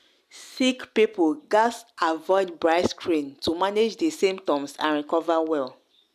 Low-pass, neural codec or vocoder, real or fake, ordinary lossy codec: 14.4 kHz; none; real; none